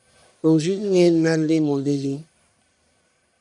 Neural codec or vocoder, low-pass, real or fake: codec, 44.1 kHz, 1.7 kbps, Pupu-Codec; 10.8 kHz; fake